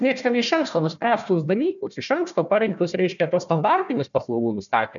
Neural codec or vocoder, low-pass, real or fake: codec, 16 kHz, 1 kbps, FunCodec, trained on Chinese and English, 50 frames a second; 7.2 kHz; fake